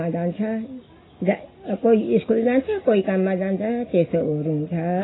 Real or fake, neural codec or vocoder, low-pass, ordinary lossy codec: real; none; 7.2 kHz; AAC, 16 kbps